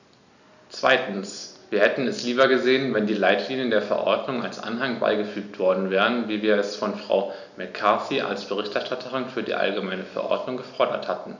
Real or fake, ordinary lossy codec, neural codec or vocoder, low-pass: real; none; none; 7.2 kHz